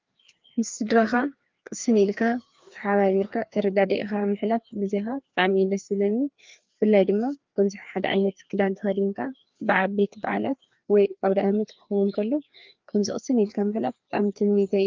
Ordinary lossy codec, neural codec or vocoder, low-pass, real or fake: Opus, 32 kbps; codec, 16 kHz, 2 kbps, FreqCodec, larger model; 7.2 kHz; fake